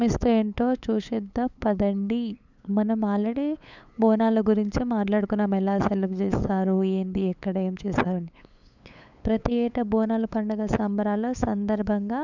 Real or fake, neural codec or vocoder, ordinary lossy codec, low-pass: fake; codec, 16 kHz, 8 kbps, FunCodec, trained on LibriTTS, 25 frames a second; none; 7.2 kHz